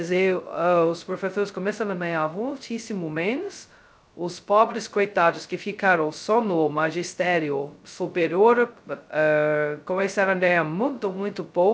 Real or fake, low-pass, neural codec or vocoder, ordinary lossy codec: fake; none; codec, 16 kHz, 0.2 kbps, FocalCodec; none